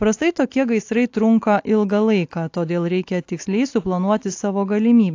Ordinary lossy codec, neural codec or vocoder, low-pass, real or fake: AAC, 48 kbps; none; 7.2 kHz; real